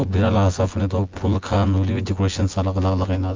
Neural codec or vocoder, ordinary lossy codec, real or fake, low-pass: vocoder, 24 kHz, 100 mel bands, Vocos; Opus, 32 kbps; fake; 7.2 kHz